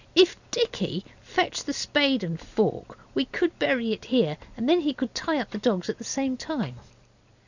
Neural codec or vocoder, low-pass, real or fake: none; 7.2 kHz; real